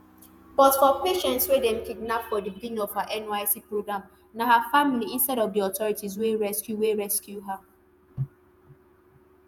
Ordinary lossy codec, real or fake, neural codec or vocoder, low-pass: none; fake; vocoder, 48 kHz, 128 mel bands, Vocos; none